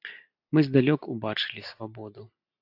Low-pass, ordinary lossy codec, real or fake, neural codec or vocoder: 5.4 kHz; AAC, 32 kbps; real; none